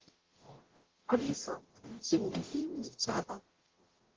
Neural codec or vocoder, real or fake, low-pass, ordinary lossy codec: codec, 44.1 kHz, 0.9 kbps, DAC; fake; 7.2 kHz; Opus, 16 kbps